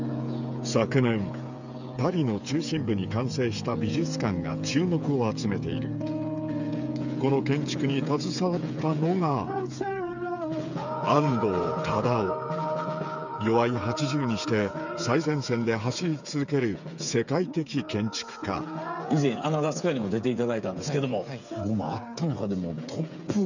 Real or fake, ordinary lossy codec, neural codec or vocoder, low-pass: fake; none; codec, 16 kHz, 8 kbps, FreqCodec, smaller model; 7.2 kHz